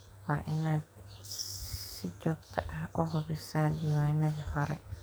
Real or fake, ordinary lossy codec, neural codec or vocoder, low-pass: fake; none; codec, 44.1 kHz, 2.6 kbps, SNAC; none